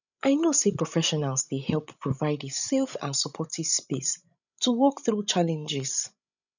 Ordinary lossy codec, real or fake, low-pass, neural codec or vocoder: none; fake; 7.2 kHz; codec, 16 kHz, 16 kbps, FreqCodec, larger model